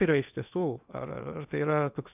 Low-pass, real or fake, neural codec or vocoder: 3.6 kHz; fake; codec, 16 kHz in and 24 kHz out, 0.8 kbps, FocalCodec, streaming, 65536 codes